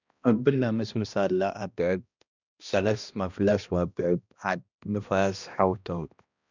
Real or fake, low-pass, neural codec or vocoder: fake; 7.2 kHz; codec, 16 kHz, 1 kbps, X-Codec, HuBERT features, trained on balanced general audio